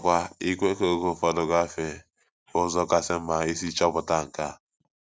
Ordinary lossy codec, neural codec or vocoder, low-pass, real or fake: none; none; none; real